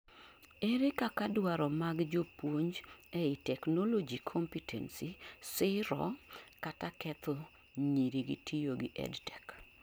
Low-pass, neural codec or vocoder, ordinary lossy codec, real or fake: none; none; none; real